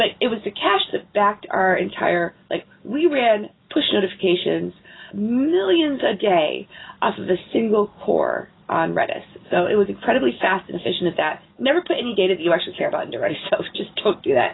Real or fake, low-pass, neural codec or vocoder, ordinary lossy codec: real; 7.2 kHz; none; AAC, 16 kbps